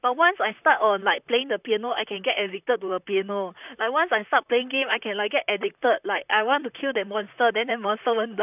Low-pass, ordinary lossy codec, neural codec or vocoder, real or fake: 3.6 kHz; none; vocoder, 44.1 kHz, 128 mel bands, Pupu-Vocoder; fake